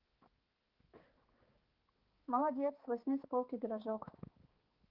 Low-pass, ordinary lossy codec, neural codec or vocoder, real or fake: 5.4 kHz; Opus, 16 kbps; codec, 16 kHz, 4 kbps, X-Codec, HuBERT features, trained on balanced general audio; fake